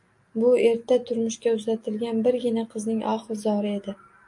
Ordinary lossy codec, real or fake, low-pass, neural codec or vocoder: AAC, 64 kbps; real; 10.8 kHz; none